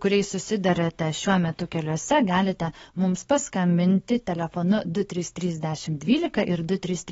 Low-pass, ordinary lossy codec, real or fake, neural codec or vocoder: 19.8 kHz; AAC, 24 kbps; fake; codec, 44.1 kHz, 7.8 kbps, DAC